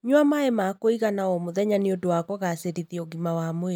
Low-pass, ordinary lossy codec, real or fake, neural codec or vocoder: none; none; real; none